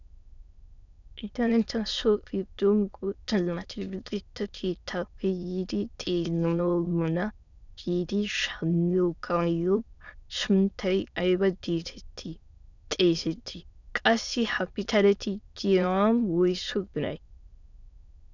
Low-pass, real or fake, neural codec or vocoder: 7.2 kHz; fake; autoencoder, 22.05 kHz, a latent of 192 numbers a frame, VITS, trained on many speakers